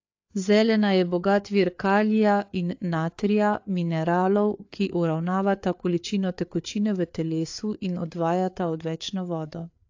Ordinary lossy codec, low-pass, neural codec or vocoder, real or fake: AAC, 48 kbps; 7.2 kHz; codec, 16 kHz, 4 kbps, FreqCodec, larger model; fake